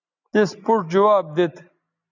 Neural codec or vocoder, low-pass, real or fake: none; 7.2 kHz; real